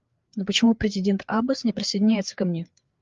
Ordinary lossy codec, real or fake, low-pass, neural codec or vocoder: Opus, 32 kbps; fake; 7.2 kHz; codec, 16 kHz, 4 kbps, FreqCodec, larger model